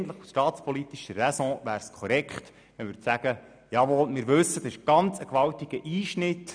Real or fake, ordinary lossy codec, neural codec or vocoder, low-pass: real; none; none; 9.9 kHz